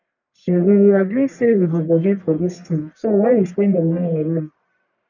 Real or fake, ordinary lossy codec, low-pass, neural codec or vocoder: fake; none; 7.2 kHz; codec, 44.1 kHz, 1.7 kbps, Pupu-Codec